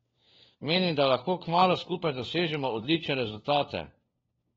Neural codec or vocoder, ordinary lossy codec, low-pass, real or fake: codec, 16 kHz, 4 kbps, FunCodec, trained on LibriTTS, 50 frames a second; AAC, 24 kbps; 7.2 kHz; fake